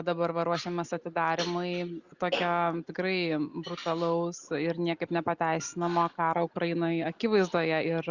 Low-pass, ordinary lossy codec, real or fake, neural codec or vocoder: 7.2 kHz; Opus, 64 kbps; real; none